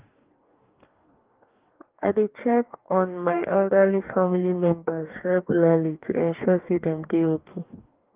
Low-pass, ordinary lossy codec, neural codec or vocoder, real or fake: 3.6 kHz; Opus, 32 kbps; codec, 44.1 kHz, 2.6 kbps, DAC; fake